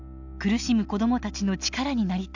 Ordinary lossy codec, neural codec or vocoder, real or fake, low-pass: none; none; real; 7.2 kHz